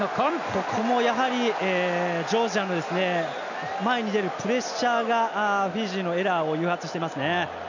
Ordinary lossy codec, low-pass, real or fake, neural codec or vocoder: none; 7.2 kHz; real; none